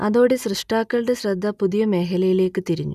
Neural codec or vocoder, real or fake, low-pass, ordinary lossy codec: none; real; 14.4 kHz; none